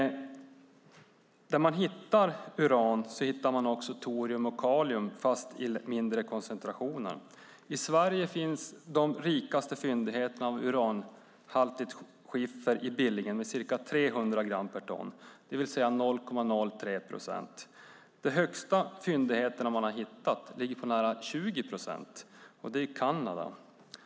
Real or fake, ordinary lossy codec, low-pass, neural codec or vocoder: real; none; none; none